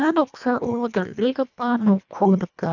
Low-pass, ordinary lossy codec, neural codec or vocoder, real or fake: 7.2 kHz; none; codec, 24 kHz, 1.5 kbps, HILCodec; fake